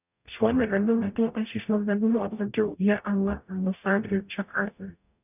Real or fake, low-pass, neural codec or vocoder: fake; 3.6 kHz; codec, 44.1 kHz, 0.9 kbps, DAC